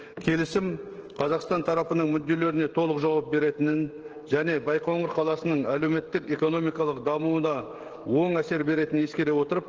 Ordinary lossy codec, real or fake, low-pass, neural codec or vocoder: Opus, 16 kbps; fake; 7.2 kHz; codec, 16 kHz, 16 kbps, FreqCodec, smaller model